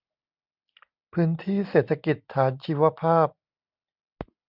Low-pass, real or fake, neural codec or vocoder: 5.4 kHz; real; none